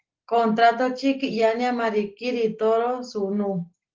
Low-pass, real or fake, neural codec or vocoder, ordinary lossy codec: 7.2 kHz; real; none; Opus, 16 kbps